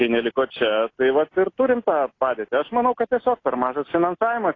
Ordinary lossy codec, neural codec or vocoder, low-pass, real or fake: AAC, 32 kbps; none; 7.2 kHz; real